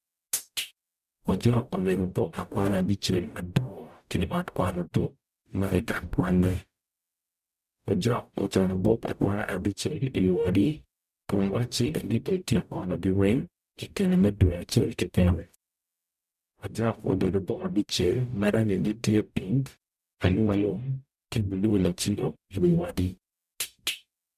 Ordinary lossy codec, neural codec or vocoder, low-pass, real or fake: none; codec, 44.1 kHz, 0.9 kbps, DAC; 14.4 kHz; fake